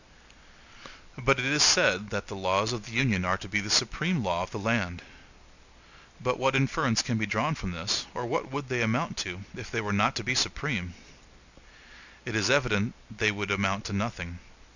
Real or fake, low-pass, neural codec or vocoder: real; 7.2 kHz; none